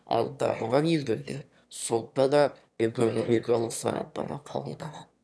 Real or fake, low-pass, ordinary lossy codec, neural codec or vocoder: fake; none; none; autoencoder, 22.05 kHz, a latent of 192 numbers a frame, VITS, trained on one speaker